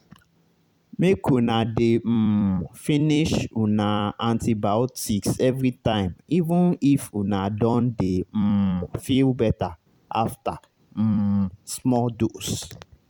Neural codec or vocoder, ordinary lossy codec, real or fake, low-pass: vocoder, 44.1 kHz, 128 mel bands every 256 samples, BigVGAN v2; none; fake; 19.8 kHz